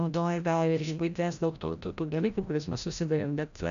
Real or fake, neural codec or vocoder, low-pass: fake; codec, 16 kHz, 0.5 kbps, FreqCodec, larger model; 7.2 kHz